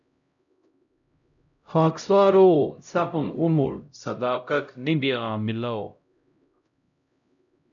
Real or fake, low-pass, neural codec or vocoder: fake; 7.2 kHz; codec, 16 kHz, 0.5 kbps, X-Codec, HuBERT features, trained on LibriSpeech